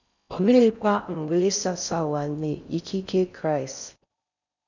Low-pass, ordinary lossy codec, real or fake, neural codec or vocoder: 7.2 kHz; none; fake; codec, 16 kHz in and 24 kHz out, 0.6 kbps, FocalCodec, streaming, 4096 codes